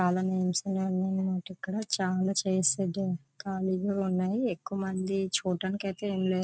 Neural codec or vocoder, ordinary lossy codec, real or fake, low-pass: none; none; real; none